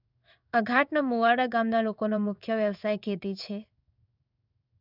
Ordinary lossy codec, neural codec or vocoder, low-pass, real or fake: none; codec, 16 kHz in and 24 kHz out, 1 kbps, XY-Tokenizer; 5.4 kHz; fake